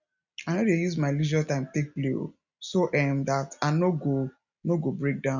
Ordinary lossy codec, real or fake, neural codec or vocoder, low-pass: none; real; none; 7.2 kHz